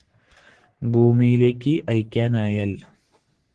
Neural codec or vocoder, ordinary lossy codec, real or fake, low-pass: codec, 44.1 kHz, 3.4 kbps, Pupu-Codec; Opus, 16 kbps; fake; 10.8 kHz